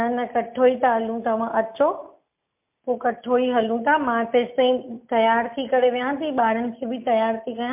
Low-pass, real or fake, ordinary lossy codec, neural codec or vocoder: 3.6 kHz; real; MP3, 32 kbps; none